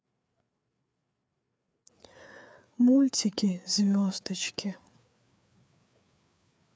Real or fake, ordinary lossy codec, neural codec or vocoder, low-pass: fake; none; codec, 16 kHz, 4 kbps, FreqCodec, larger model; none